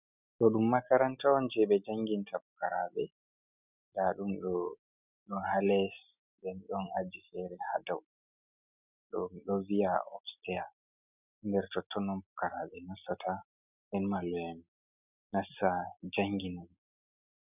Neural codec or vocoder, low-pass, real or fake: none; 3.6 kHz; real